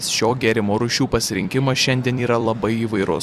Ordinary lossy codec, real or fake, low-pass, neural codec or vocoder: Opus, 64 kbps; fake; 14.4 kHz; vocoder, 44.1 kHz, 128 mel bands every 256 samples, BigVGAN v2